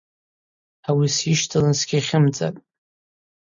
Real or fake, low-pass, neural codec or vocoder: real; 7.2 kHz; none